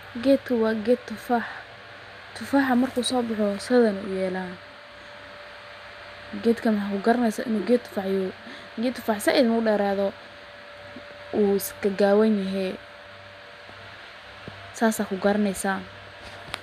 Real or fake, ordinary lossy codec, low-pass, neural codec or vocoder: real; MP3, 96 kbps; 14.4 kHz; none